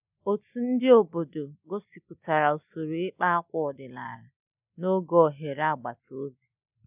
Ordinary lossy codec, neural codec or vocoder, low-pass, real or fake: none; none; 3.6 kHz; real